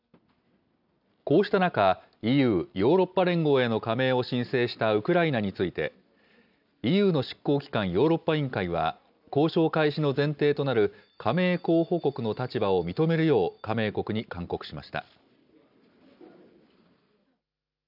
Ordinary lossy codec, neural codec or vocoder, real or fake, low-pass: none; none; real; 5.4 kHz